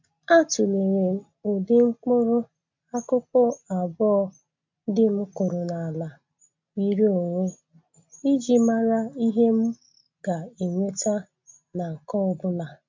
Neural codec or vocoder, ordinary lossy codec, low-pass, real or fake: none; MP3, 64 kbps; 7.2 kHz; real